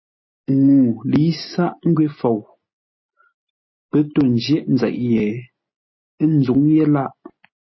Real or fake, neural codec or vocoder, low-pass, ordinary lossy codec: real; none; 7.2 kHz; MP3, 24 kbps